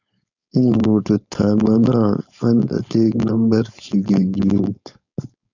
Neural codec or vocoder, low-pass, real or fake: codec, 16 kHz, 4.8 kbps, FACodec; 7.2 kHz; fake